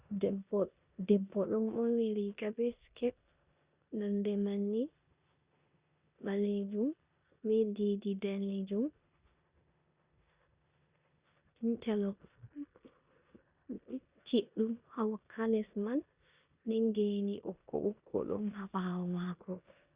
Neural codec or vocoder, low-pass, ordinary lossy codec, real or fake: codec, 16 kHz in and 24 kHz out, 0.9 kbps, LongCat-Audio-Codec, four codebook decoder; 3.6 kHz; Opus, 64 kbps; fake